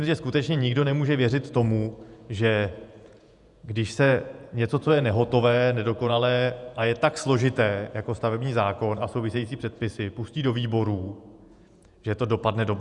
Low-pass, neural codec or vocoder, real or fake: 10.8 kHz; vocoder, 48 kHz, 128 mel bands, Vocos; fake